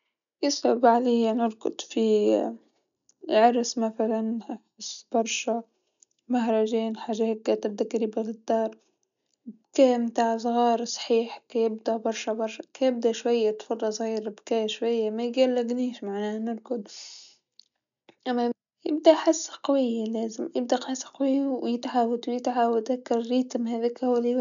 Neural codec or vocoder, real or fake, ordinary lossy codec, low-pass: none; real; none; 7.2 kHz